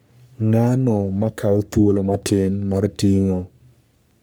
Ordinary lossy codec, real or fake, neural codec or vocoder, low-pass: none; fake; codec, 44.1 kHz, 3.4 kbps, Pupu-Codec; none